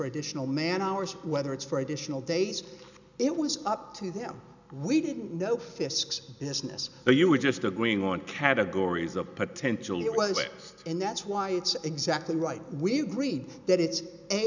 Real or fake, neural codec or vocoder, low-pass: real; none; 7.2 kHz